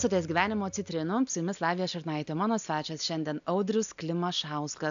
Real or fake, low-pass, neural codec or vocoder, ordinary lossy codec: real; 7.2 kHz; none; AAC, 96 kbps